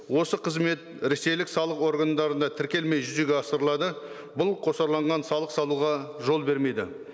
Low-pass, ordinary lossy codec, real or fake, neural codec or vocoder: none; none; real; none